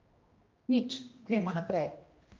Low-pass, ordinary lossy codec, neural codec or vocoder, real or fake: 7.2 kHz; Opus, 32 kbps; codec, 16 kHz, 1 kbps, X-Codec, HuBERT features, trained on general audio; fake